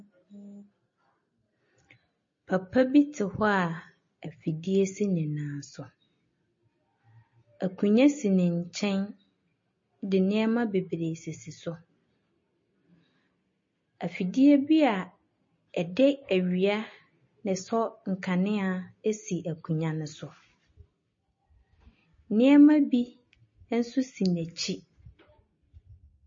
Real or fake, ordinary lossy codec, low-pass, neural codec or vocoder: real; MP3, 32 kbps; 7.2 kHz; none